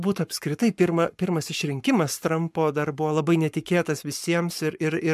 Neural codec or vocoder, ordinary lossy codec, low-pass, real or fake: codec, 44.1 kHz, 7.8 kbps, Pupu-Codec; MP3, 96 kbps; 14.4 kHz; fake